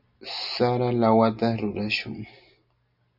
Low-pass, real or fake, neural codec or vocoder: 5.4 kHz; real; none